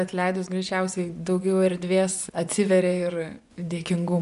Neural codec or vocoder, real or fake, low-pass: vocoder, 24 kHz, 100 mel bands, Vocos; fake; 10.8 kHz